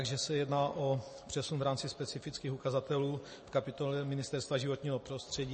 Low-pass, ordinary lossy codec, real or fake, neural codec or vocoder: 10.8 kHz; MP3, 32 kbps; real; none